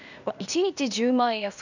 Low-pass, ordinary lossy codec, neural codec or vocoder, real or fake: 7.2 kHz; none; codec, 16 kHz, 0.8 kbps, ZipCodec; fake